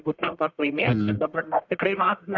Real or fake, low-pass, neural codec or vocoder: fake; 7.2 kHz; codec, 44.1 kHz, 1.7 kbps, Pupu-Codec